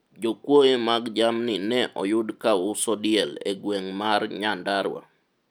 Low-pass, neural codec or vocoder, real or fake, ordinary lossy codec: 19.8 kHz; none; real; none